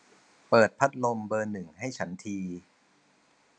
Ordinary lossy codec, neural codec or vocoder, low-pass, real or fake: none; none; 9.9 kHz; real